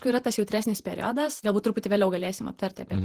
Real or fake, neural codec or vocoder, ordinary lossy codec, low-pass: fake; vocoder, 48 kHz, 128 mel bands, Vocos; Opus, 16 kbps; 14.4 kHz